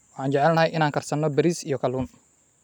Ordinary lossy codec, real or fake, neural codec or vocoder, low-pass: none; real; none; 19.8 kHz